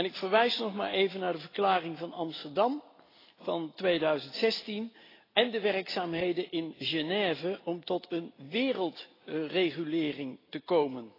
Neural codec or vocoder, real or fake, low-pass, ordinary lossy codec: none; real; 5.4 kHz; AAC, 24 kbps